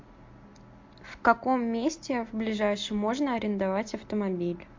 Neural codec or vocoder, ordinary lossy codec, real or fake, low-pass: none; MP3, 48 kbps; real; 7.2 kHz